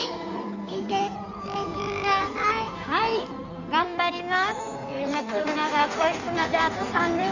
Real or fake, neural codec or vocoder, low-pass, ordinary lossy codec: fake; codec, 16 kHz in and 24 kHz out, 1.1 kbps, FireRedTTS-2 codec; 7.2 kHz; none